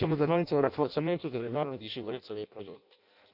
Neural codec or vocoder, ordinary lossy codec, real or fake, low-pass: codec, 16 kHz in and 24 kHz out, 0.6 kbps, FireRedTTS-2 codec; none; fake; 5.4 kHz